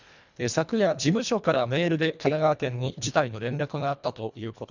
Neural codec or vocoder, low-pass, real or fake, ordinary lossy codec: codec, 24 kHz, 1.5 kbps, HILCodec; 7.2 kHz; fake; none